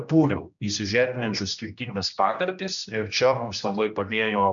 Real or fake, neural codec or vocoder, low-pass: fake; codec, 16 kHz, 1 kbps, X-Codec, HuBERT features, trained on general audio; 7.2 kHz